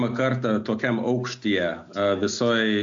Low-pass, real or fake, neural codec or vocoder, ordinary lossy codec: 7.2 kHz; real; none; MP3, 48 kbps